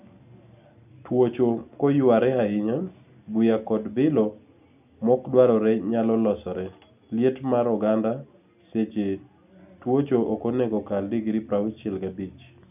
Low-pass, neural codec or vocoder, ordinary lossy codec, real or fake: 3.6 kHz; none; none; real